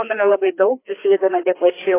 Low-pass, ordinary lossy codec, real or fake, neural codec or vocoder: 3.6 kHz; AAC, 16 kbps; fake; codec, 16 kHz, 2 kbps, FreqCodec, larger model